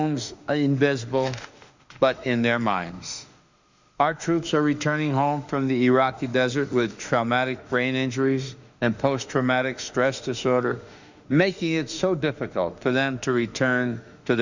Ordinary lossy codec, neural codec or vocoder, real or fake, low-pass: Opus, 64 kbps; autoencoder, 48 kHz, 32 numbers a frame, DAC-VAE, trained on Japanese speech; fake; 7.2 kHz